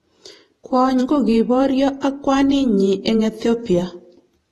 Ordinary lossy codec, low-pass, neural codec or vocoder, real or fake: AAC, 32 kbps; 19.8 kHz; vocoder, 48 kHz, 128 mel bands, Vocos; fake